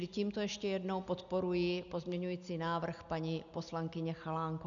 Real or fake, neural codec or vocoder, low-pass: real; none; 7.2 kHz